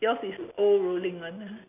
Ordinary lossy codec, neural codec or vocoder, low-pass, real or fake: none; none; 3.6 kHz; real